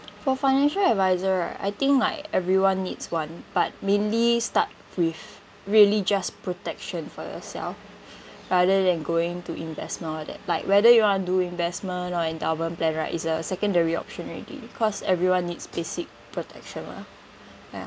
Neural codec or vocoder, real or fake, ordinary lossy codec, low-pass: none; real; none; none